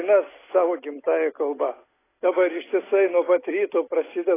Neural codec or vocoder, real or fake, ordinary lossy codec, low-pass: none; real; AAC, 16 kbps; 3.6 kHz